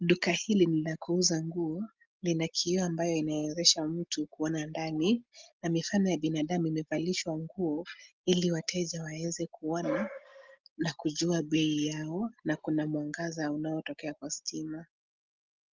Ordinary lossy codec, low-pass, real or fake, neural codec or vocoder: Opus, 32 kbps; 7.2 kHz; real; none